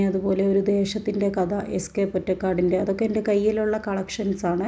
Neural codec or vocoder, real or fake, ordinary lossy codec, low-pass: none; real; none; none